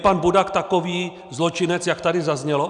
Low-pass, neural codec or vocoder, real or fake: 10.8 kHz; none; real